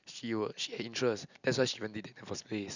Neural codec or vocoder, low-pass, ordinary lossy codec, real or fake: none; 7.2 kHz; none; real